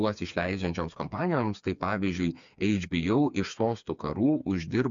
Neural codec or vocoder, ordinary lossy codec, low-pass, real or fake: codec, 16 kHz, 4 kbps, FreqCodec, smaller model; MP3, 64 kbps; 7.2 kHz; fake